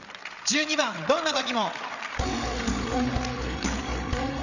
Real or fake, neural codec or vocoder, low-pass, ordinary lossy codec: fake; codec, 16 kHz, 8 kbps, FreqCodec, larger model; 7.2 kHz; none